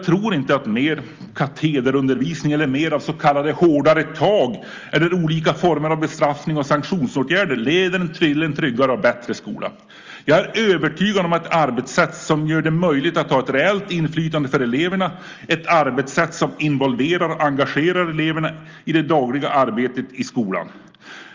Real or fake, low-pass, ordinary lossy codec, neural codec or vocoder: real; 7.2 kHz; Opus, 32 kbps; none